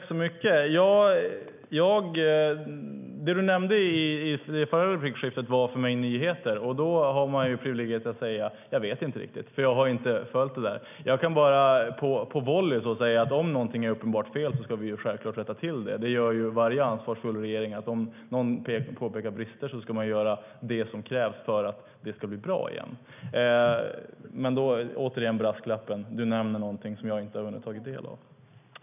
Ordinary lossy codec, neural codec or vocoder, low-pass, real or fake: none; none; 3.6 kHz; real